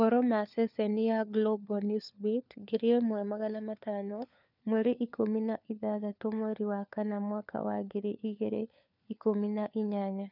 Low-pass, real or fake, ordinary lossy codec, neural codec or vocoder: 5.4 kHz; fake; none; codec, 16 kHz, 4 kbps, FunCodec, trained on LibriTTS, 50 frames a second